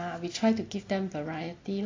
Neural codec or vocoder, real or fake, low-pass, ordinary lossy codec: none; real; 7.2 kHz; AAC, 32 kbps